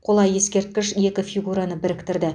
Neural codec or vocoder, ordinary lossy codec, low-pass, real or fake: none; none; 9.9 kHz; real